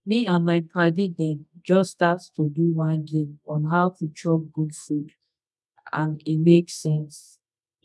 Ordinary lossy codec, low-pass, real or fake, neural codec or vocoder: none; none; fake; codec, 24 kHz, 0.9 kbps, WavTokenizer, medium music audio release